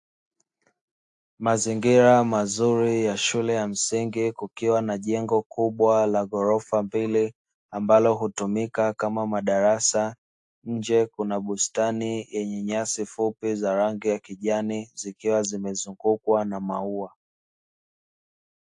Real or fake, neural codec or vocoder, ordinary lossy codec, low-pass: real; none; AAC, 64 kbps; 10.8 kHz